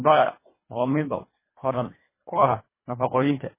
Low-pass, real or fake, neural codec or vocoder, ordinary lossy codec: 3.6 kHz; fake; codec, 24 kHz, 1.5 kbps, HILCodec; MP3, 16 kbps